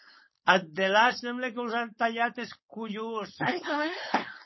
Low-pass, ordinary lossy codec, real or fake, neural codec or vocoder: 7.2 kHz; MP3, 24 kbps; fake; codec, 16 kHz, 4.8 kbps, FACodec